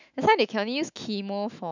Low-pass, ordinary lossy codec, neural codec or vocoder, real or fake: 7.2 kHz; none; autoencoder, 48 kHz, 128 numbers a frame, DAC-VAE, trained on Japanese speech; fake